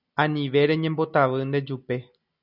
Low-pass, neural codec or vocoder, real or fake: 5.4 kHz; none; real